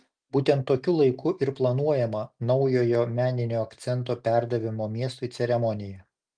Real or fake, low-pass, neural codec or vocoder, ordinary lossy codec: real; 9.9 kHz; none; Opus, 32 kbps